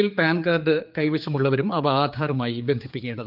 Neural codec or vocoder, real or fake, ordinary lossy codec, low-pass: codec, 16 kHz, 4 kbps, X-Codec, HuBERT features, trained on general audio; fake; Opus, 24 kbps; 5.4 kHz